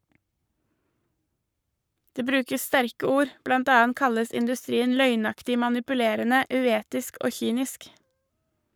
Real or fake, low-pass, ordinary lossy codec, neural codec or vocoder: fake; none; none; codec, 44.1 kHz, 7.8 kbps, Pupu-Codec